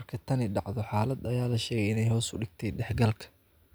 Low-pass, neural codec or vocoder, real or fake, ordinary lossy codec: none; none; real; none